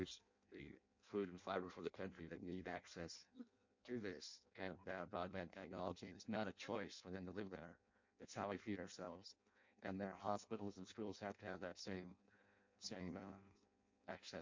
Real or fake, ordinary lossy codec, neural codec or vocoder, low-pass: fake; MP3, 48 kbps; codec, 16 kHz in and 24 kHz out, 0.6 kbps, FireRedTTS-2 codec; 7.2 kHz